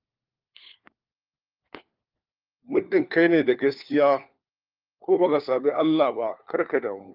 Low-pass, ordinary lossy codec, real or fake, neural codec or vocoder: 5.4 kHz; Opus, 24 kbps; fake; codec, 16 kHz, 4 kbps, FunCodec, trained on LibriTTS, 50 frames a second